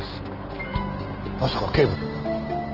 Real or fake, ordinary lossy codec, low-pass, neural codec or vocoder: real; Opus, 16 kbps; 5.4 kHz; none